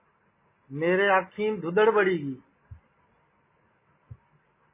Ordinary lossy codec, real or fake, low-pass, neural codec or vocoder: MP3, 16 kbps; real; 3.6 kHz; none